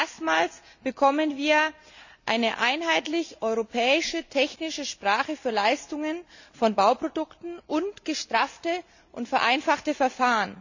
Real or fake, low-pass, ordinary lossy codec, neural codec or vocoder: real; 7.2 kHz; none; none